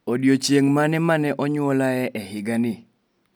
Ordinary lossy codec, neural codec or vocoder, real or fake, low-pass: none; none; real; none